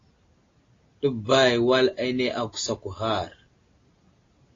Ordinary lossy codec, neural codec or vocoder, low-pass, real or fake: AAC, 32 kbps; none; 7.2 kHz; real